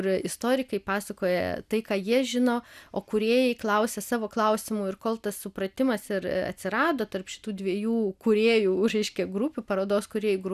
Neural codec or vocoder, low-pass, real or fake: none; 14.4 kHz; real